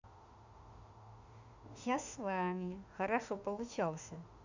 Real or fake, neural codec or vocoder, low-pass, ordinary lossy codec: fake; autoencoder, 48 kHz, 32 numbers a frame, DAC-VAE, trained on Japanese speech; 7.2 kHz; Opus, 64 kbps